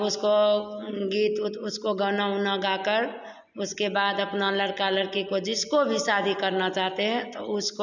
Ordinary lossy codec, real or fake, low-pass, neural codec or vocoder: none; real; 7.2 kHz; none